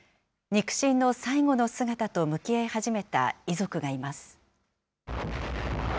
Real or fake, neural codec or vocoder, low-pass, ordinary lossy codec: real; none; none; none